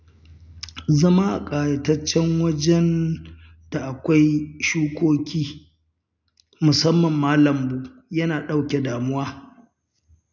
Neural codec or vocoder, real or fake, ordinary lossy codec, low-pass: none; real; none; 7.2 kHz